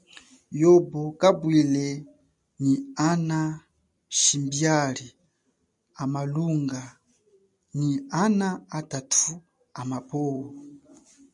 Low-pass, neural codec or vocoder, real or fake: 10.8 kHz; none; real